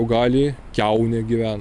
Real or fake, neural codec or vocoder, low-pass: real; none; 10.8 kHz